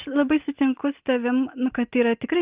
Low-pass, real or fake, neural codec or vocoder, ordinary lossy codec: 3.6 kHz; real; none; Opus, 24 kbps